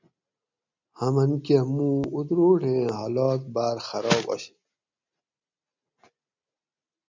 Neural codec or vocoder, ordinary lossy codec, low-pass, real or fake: none; MP3, 64 kbps; 7.2 kHz; real